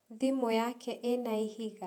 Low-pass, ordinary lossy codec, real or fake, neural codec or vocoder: 19.8 kHz; none; fake; vocoder, 48 kHz, 128 mel bands, Vocos